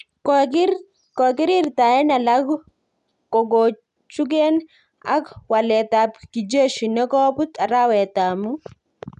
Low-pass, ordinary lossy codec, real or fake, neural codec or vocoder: 10.8 kHz; none; real; none